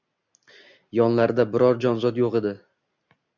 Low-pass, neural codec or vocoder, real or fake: 7.2 kHz; none; real